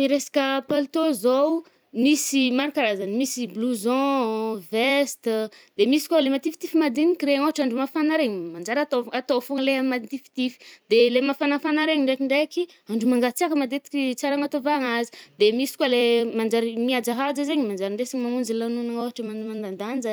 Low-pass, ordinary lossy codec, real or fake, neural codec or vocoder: none; none; fake; vocoder, 44.1 kHz, 128 mel bands every 256 samples, BigVGAN v2